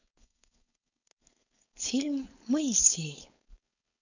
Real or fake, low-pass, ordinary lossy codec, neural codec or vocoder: fake; 7.2 kHz; none; codec, 16 kHz, 4.8 kbps, FACodec